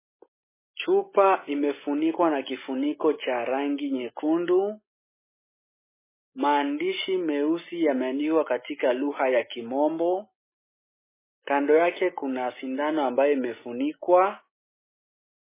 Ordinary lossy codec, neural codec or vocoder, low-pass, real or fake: MP3, 16 kbps; none; 3.6 kHz; real